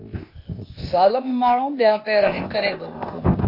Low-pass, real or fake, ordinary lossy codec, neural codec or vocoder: 5.4 kHz; fake; MP3, 32 kbps; codec, 16 kHz, 0.8 kbps, ZipCodec